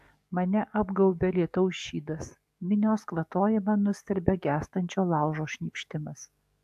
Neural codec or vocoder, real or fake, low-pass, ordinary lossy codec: codec, 44.1 kHz, 7.8 kbps, DAC; fake; 14.4 kHz; AAC, 96 kbps